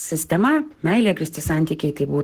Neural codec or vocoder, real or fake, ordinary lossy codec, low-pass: codec, 44.1 kHz, 7.8 kbps, Pupu-Codec; fake; Opus, 16 kbps; 14.4 kHz